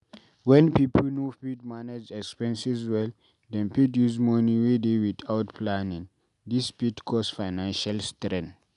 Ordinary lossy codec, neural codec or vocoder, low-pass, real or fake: none; none; 10.8 kHz; real